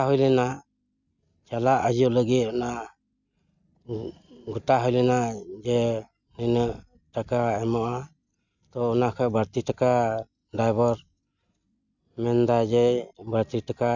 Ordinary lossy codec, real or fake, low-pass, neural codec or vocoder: none; real; 7.2 kHz; none